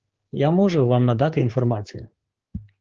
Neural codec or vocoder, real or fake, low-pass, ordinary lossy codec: codec, 16 kHz, 4 kbps, X-Codec, HuBERT features, trained on general audio; fake; 7.2 kHz; Opus, 16 kbps